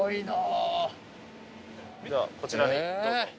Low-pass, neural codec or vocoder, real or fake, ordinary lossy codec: none; none; real; none